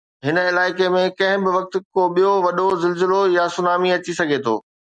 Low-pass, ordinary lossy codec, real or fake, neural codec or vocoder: 9.9 kHz; MP3, 96 kbps; real; none